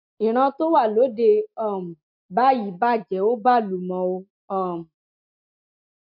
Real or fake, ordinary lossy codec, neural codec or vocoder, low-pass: real; MP3, 48 kbps; none; 5.4 kHz